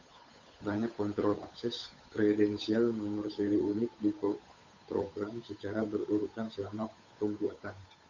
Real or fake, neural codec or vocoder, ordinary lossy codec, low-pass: fake; codec, 16 kHz, 8 kbps, FunCodec, trained on Chinese and English, 25 frames a second; Opus, 64 kbps; 7.2 kHz